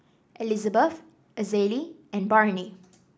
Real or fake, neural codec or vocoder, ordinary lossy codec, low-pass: real; none; none; none